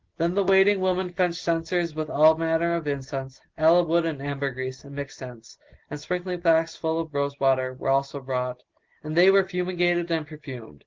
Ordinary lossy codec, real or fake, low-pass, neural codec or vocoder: Opus, 16 kbps; real; 7.2 kHz; none